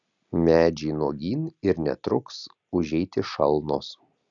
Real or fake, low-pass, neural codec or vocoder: real; 7.2 kHz; none